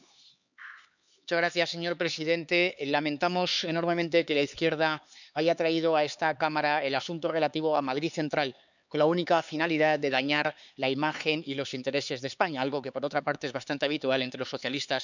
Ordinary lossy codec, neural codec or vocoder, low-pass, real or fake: none; codec, 16 kHz, 4 kbps, X-Codec, HuBERT features, trained on LibriSpeech; 7.2 kHz; fake